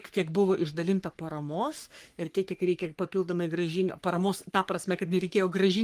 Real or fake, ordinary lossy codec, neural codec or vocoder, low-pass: fake; Opus, 32 kbps; codec, 44.1 kHz, 3.4 kbps, Pupu-Codec; 14.4 kHz